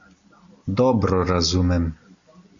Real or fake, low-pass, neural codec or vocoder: real; 7.2 kHz; none